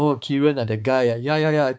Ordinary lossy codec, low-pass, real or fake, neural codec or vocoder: none; none; fake; codec, 16 kHz, 4 kbps, X-Codec, HuBERT features, trained on balanced general audio